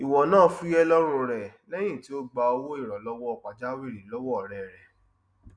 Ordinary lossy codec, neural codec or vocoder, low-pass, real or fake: none; none; 9.9 kHz; real